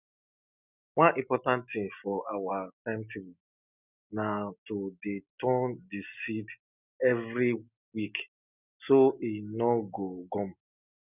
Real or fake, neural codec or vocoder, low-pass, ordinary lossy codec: real; none; 3.6 kHz; none